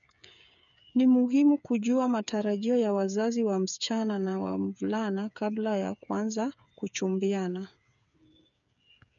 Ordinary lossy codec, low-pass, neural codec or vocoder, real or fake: none; 7.2 kHz; codec, 16 kHz, 8 kbps, FreqCodec, smaller model; fake